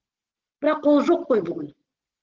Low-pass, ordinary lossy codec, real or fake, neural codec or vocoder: 7.2 kHz; Opus, 16 kbps; real; none